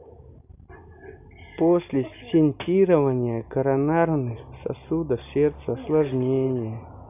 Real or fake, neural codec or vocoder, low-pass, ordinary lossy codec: real; none; 3.6 kHz; none